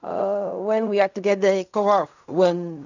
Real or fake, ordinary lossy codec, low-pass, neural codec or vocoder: fake; none; 7.2 kHz; codec, 16 kHz in and 24 kHz out, 0.4 kbps, LongCat-Audio-Codec, fine tuned four codebook decoder